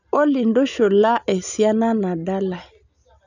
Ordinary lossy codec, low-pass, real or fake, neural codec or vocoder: none; 7.2 kHz; real; none